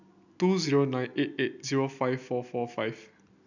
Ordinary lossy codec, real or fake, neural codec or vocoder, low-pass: none; real; none; 7.2 kHz